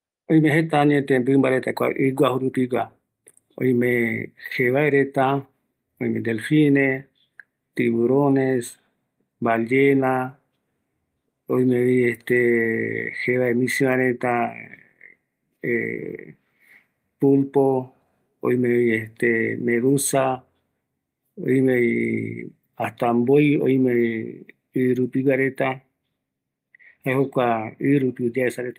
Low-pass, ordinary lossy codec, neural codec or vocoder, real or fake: 14.4 kHz; Opus, 32 kbps; none; real